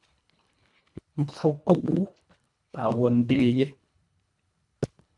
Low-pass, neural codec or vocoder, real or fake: 10.8 kHz; codec, 24 kHz, 1.5 kbps, HILCodec; fake